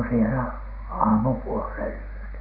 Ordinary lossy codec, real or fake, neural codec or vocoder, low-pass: none; real; none; 5.4 kHz